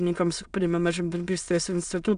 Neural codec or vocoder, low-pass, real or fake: autoencoder, 22.05 kHz, a latent of 192 numbers a frame, VITS, trained on many speakers; 9.9 kHz; fake